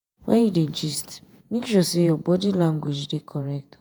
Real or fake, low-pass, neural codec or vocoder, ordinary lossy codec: fake; none; vocoder, 48 kHz, 128 mel bands, Vocos; none